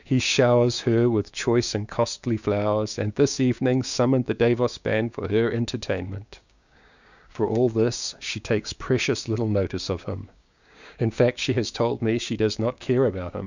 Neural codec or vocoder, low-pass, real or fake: codec, 16 kHz, 6 kbps, DAC; 7.2 kHz; fake